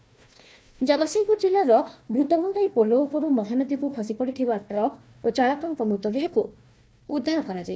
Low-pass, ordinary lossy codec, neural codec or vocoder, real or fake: none; none; codec, 16 kHz, 1 kbps, FunCodec, trained on Chinese and English, 50 frames a second; fake